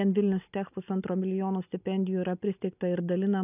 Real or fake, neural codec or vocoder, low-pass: real; none; 3.6 kHz